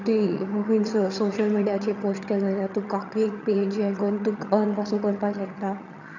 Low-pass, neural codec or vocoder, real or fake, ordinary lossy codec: 7.2 kHz; vocoder, 22.05 kHz, 80 mel bands, HiFi-GAN; fake; none